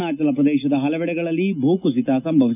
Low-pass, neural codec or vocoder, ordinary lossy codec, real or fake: 3.6 kHz; none; none; real